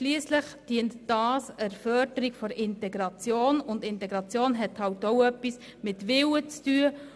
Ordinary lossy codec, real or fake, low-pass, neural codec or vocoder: none; real; none; none